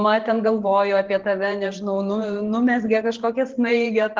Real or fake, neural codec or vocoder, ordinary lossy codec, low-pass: fake; vocoder, 44.1 kHz, 128 mel bands every 512 samples, BigVGAN v2; Opus, 16 kbps; 7.2 kHz